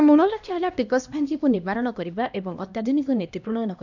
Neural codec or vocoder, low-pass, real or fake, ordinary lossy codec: codec, 16 kHz, 1 kbps, X-Codec, HuBERT features, trained on LibriSpeech; 7.2 kHz; fake; none